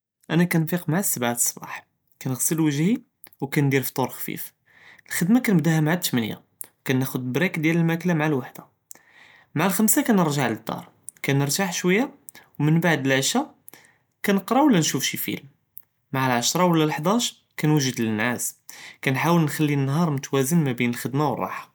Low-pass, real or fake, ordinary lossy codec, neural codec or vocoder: none; real; none; none